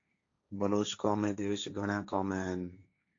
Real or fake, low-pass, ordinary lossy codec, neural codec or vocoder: fake; 7.2 kHz; AAC, 48 kbps; codec, 16 kHz, 1.1 kbps, Voila-Tokenizer